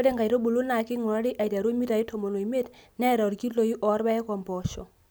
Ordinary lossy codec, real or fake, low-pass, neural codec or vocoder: none; real; none; none